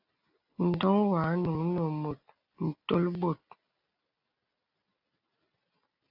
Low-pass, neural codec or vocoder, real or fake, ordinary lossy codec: 5.4 kHz; none; real; AAC, 48 kbps